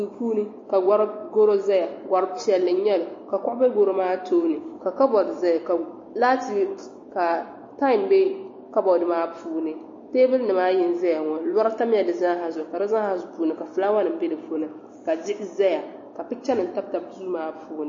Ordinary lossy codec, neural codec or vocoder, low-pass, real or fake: MP3, 32 kbps; none; 7.2 kHz; real